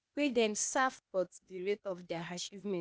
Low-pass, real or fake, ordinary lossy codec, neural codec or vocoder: none; fake; none; codec, 16 kHz, 0.8 kbps, ZipCodec